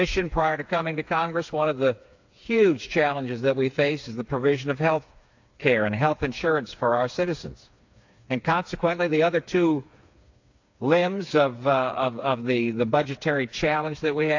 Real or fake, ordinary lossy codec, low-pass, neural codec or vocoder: fake; AAC, 48 kbps; 7.2 kHz; codec, 16 kHz, 4 kbps, FreqCodec, smaller model